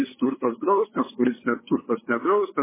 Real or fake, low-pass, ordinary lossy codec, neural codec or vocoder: fake; 3.6 kHz; MP3, 16 kbps; codec, 16 kHz, 8 kbps, FunCodec, trained on LibriTTS, 25 frames a second